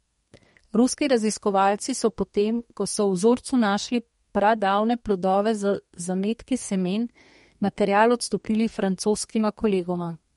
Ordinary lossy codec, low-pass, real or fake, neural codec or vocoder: MP3, 48 kbps; 14.4 kHz; fake; codec, 32 kHz, 1.9 kbps, SNAC